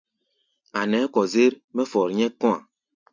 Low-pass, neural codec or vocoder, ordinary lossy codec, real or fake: 7.2 kHz; none; MP3, 64 kbps; real